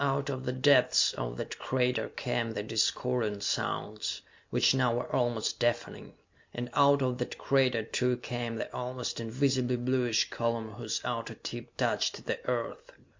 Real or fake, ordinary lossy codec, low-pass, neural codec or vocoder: real; MP3, 48 kbps; 7.2 kHz; none